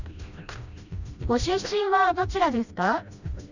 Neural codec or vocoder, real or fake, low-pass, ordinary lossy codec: codec, 16 kHz, 1 kbps, FreqCodec, smaller model; fake; 7.2 kHz; none